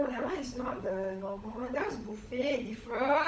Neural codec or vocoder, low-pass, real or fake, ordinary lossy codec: codec, 16 kHz, 16 kbps, FunCodec, trained on LibriTTS, 50 frames a second; none; fake; none